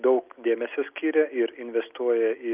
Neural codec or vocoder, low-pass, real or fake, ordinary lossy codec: none; 3.6 kHz; real; Opus, 32 kbps